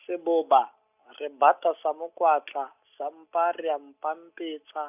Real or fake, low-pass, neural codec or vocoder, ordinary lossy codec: real; 3.6 kHz; none; none